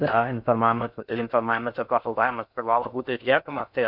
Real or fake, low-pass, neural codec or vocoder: fake; 5.4 kHz; codec, 16 kHz in and 24 kHz out, 0.6 kbps, FocalCodec, streaming, 4096 codes